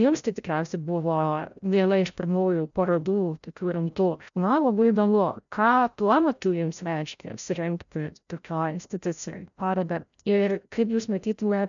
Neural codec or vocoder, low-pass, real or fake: codec, 16 kHz, 0.5 kbps, FreqCodec, larger model; 7.2 kHz; fake